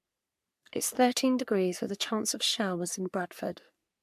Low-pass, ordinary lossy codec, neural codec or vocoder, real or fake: 14.4 kHz; MP3, 96 kbps; codec, 44.1 kHz, 3.4 kbps, Pupu-Codec; fake